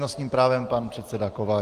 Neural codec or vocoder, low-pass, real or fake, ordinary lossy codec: none; 14.4 kHz; real; Opus, 24 kbps